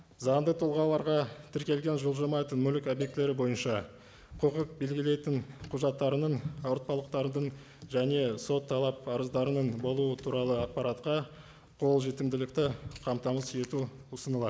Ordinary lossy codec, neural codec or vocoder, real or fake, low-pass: none; none; real; none